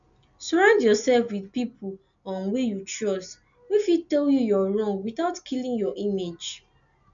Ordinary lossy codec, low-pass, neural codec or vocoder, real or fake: none; 7.2 kHz; none; real